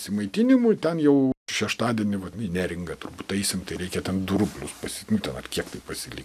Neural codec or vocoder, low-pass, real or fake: none; 14.4 kHz; real